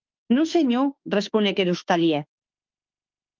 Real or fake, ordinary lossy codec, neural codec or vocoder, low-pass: fake; Opus, 24 kbps; autoencoder, 48 kHz, 32 numbers a frame, DAC-VAE, trained on Japanese speech; 7.2 kHz